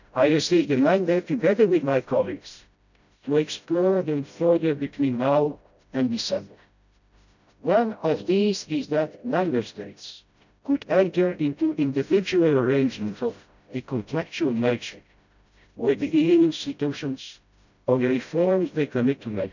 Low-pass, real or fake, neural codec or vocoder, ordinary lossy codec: 7.2 kHz; fake; codec, 16 kHz, 0.5 kbps, FreqCodec, smaller model; none